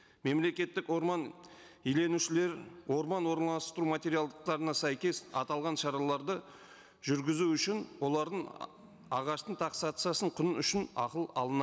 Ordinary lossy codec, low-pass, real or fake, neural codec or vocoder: none; none; real; none